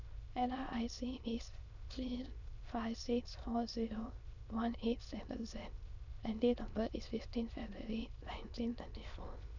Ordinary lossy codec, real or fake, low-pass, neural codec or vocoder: none; fake; 7.2 kHz; autoencoder, 22.05 kHz, a latent of 192 numbers a frame, VITS, trained on many speakers